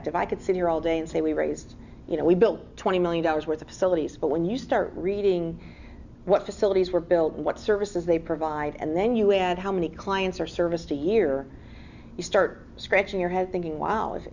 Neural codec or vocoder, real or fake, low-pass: none; real; 7.2 kHz